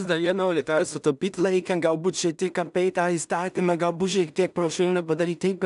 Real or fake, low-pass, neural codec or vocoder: fake; 10.8 kHz; codec, 16 kHz in and 24 kHz out, 0.4 kbps, LongCat-Audio-Codec, two codebook decoder